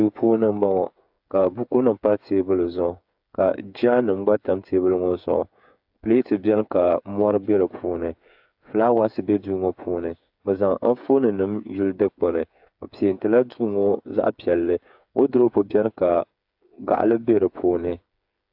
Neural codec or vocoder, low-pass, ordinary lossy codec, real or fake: codec, 16 kHz, 8 kbps, FreqCodec, smaller model; 5.4 kHz; AAC, 48 kbps; fake